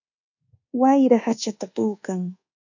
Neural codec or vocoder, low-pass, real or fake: codec, 24 kHz, 1.2 kbps, DualCodec; 7.2 kHz; fake